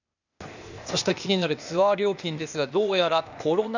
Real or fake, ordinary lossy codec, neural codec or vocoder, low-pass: fake; none; codec, 16 kHz, 0.8 kbps, ZipCodec; 7.2 kHz